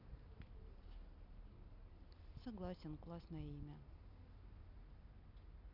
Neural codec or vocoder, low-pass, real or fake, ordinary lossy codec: none; 5.4 kHz; real; none